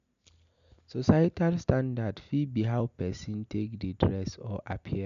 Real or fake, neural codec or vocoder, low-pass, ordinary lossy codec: real; none; 7.2 kHz; none